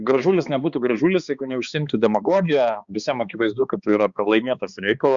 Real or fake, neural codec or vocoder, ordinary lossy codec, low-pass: fake; codec, 16 kHz, 2 kbps, X-Codec, HuBERT features, trained on balanced general audio; Opus, 64 kbps; 7.2 kHz